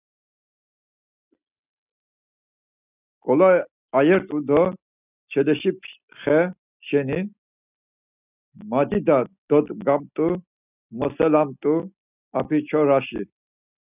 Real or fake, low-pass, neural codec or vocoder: real; 3.6 kHz; none